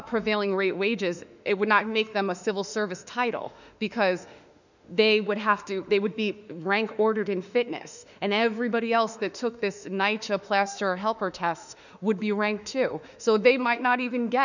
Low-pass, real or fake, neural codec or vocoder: 7.2 kHz; fake; autoencoder, 48 kHz, 32 numbers a frame, DAC-VAE, trained on Japanese speech